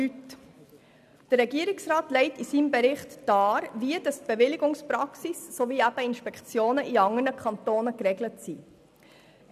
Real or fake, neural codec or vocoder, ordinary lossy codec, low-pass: real; none; none; 14.4 kHz